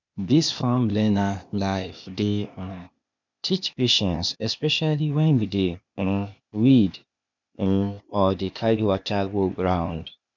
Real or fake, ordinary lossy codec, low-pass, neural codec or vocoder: fake; none; 7.2 kHz; codec, 16 kHz, 0.8 kbps, ZipCodec